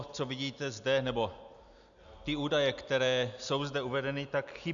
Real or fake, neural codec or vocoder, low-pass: real; none; 7.2 kHz